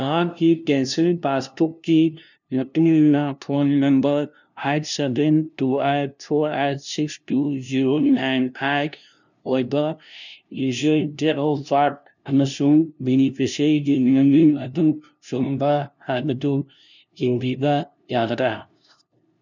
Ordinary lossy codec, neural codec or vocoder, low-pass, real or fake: none; codec, 16 kHz, 0.5 kbps, FunCodec, trained on LibriTTS, 25 frames a second; 7.2 kHz; fake